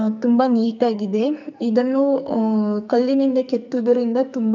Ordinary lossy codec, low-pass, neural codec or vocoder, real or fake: none; 7.2 kHz; codec, 44.1 kHz, 2.6 kbps, SNAC; fake